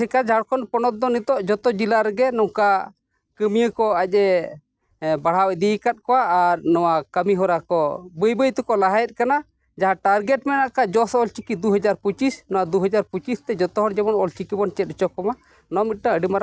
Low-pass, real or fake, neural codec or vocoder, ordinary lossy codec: none; real; none; none